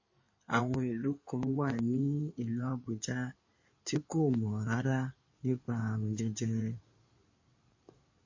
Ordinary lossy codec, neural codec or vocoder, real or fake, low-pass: MP3, 32 kbps; codec, 16 kHz in and 24 kHz out, 2.2 kbps, FireRedTTS-2 codec; fake; 7.2 kHz